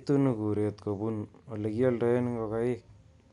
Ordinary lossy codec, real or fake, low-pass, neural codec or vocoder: none; real; 10.8 kHz; none